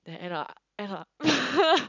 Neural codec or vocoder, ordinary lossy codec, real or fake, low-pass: none; none; real; 7.2 kHz